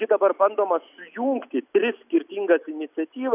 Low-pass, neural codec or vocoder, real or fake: 3.6 kHz; none; real